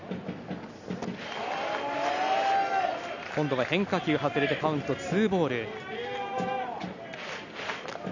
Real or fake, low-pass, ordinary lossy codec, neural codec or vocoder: real; 7.2 kHz; none; none